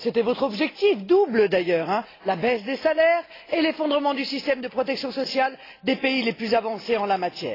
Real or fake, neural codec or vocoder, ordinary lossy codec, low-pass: real; none; AAC, 24 kbps; 5.4 kHz